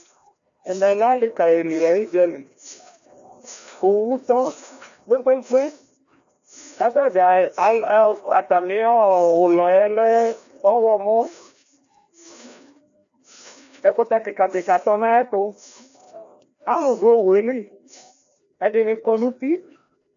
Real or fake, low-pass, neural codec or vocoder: fake; 7.2 kHz; codec, 16 kHz, 1 kbps, FreqCodec, larger model